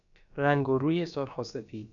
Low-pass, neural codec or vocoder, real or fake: 7.2 kHz; codec, 16 kHz, about 1 kbps, DyCAST, with the encoder's durations; fake